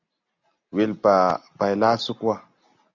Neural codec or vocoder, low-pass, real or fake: none; 7.2 kHz; real